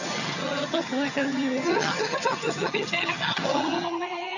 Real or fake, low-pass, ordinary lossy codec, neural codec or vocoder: fake; 7.2 kHz; none; vocoder, 22.05 kHz, 80 mel bands, HiFi-GAN